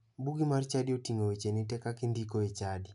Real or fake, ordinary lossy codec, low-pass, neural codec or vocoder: real; none; 10.8 kHz; none